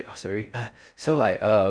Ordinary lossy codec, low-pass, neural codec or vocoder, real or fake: none; 9.9 kHz; codec, 16 kHz in and 24 kHz out, 0.6 kbps, FocalCodec, streaming, 4096 codes; fake